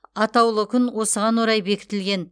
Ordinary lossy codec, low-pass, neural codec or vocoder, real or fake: none; 9.9 kHz; none; real